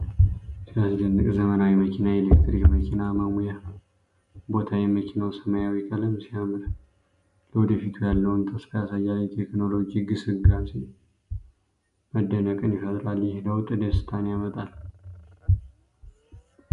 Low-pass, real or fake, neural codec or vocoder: 10.8 kHz; real; none